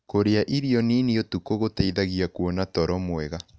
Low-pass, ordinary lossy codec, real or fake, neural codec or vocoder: none; none; real; none